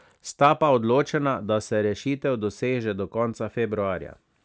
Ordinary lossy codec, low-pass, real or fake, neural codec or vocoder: none; none; real; none